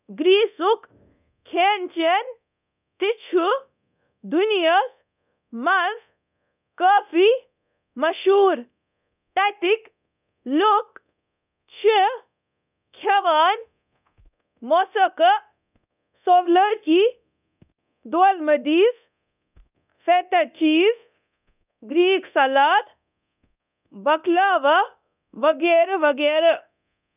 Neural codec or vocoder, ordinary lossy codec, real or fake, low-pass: codec, 24 kHz, 0.9 kbps, DualCodec; none; fake; 3.6 kHz